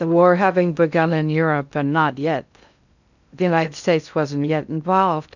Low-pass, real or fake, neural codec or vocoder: 7.2 kHz; fake; codec, 16 kHz in and 24 kHz out, 0.6 kbps, FocalCodec, streaming, 2048 codes